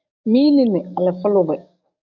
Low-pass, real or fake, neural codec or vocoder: 7.2 kHz; fake; codec, 44.1 kHz, 7.8 kbps, DAC